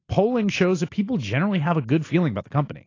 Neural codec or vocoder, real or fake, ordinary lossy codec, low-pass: none; real; AAC, 32 kbps; 7.2 kHz